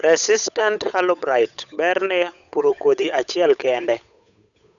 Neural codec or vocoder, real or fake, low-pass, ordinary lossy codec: codec, 16 kHz, 8 kbps, FunCodec, trained on Chinese and English, 25 frames a second; fake; 7.2 kHz; none